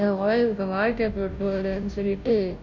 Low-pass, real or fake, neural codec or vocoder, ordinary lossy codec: 7.2 kHz; fake; codec, 16 kHz, 0.5 kbps, FunCodec, trained on Chinese and English, 25 frames a second; none